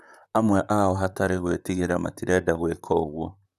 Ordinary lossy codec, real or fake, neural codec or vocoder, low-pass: none; fake; vocoder, 44.1 kHz, 128 mel bands, Pupu-Vocoder; 14.4 kHz